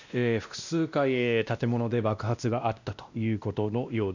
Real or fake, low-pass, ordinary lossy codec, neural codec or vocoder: fake; 7.2 kHz; none; codec, 16 kHz, 1 kbps, X-Codec, WavLM features, trained on Multilingual LibriSpeech